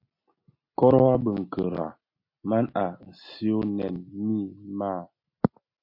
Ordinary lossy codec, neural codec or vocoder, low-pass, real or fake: MP3, 32 kbps; none; 5.4 kHz; real